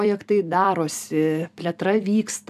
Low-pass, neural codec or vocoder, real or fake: 14.4 kHz; vocoder, 44.1 kHz, 128 mel bands every 256 samples, BigVGAN v2; fake